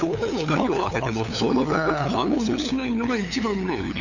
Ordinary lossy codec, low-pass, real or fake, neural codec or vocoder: none; 7.2 kHz; fake; codec, 16 kHz, 8 kbps, FunCodec, trained on LibriTTS, 25 frames a second